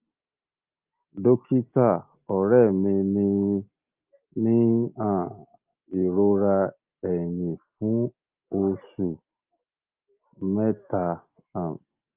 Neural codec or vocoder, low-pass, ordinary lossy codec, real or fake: none; 3.6 kHz; Opus, 24 kbps; real